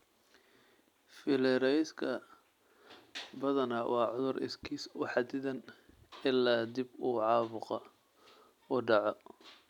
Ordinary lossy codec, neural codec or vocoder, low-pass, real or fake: none; none; 19.8 kHz; real